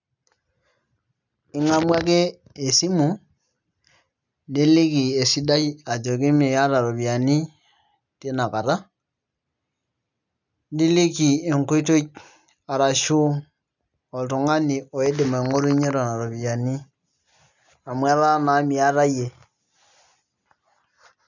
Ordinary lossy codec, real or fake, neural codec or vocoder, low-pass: none; real; none; 7.2 kHz